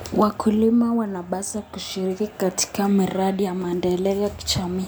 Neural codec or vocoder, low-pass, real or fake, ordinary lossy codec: none; none; real; none